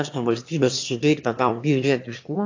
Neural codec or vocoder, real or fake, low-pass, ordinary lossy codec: autoencoder, 22.05 kHz, a latent of 192 numbers a frame, VITS, trained on one speaker; fake; 7.2 kHz; AAC, 48 kbps